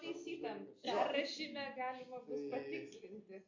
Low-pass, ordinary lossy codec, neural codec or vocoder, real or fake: 7.2 kHz; MP3, 48 kbps; none; real